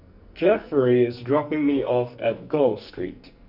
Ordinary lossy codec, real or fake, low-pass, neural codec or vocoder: none; fake; 5.4 kHz; codec, 44.1 kHz, 2.6 kbps, SNAC